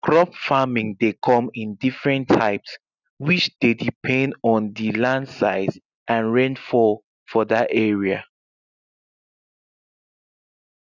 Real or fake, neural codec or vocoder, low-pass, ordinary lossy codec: real; none; 7.2 kHz; none